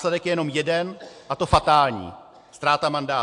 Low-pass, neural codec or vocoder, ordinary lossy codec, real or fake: 10.8 kHz; none; AAC, 64 kbps; real